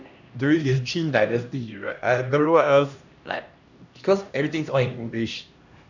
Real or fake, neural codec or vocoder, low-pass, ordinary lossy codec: fake; codec, 16 kHz, 1 kbps, X-Codec, HuBERT features, trained on LibriSpeech; 7.2 kHz; none